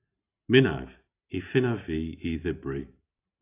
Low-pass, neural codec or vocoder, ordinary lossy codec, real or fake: 3.6 kHz; none; AAC, 32 kbps; real